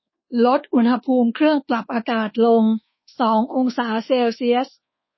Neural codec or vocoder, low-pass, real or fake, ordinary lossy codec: codec, 24 kHz, 1.2 kbps, DualCodec; 7.2 kHz; fake; MP3, 24 kbps